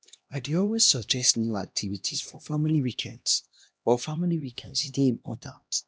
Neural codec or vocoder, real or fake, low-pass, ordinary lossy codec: codec, 16 kHz, 1 kbps, X-Codec, HuBERT features, trained on LibriSpeech; fake; none; none